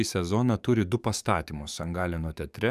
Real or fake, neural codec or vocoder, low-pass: fake; codec, 44.1 kHz, 7.8 kbps, DAC; 14.4 kHz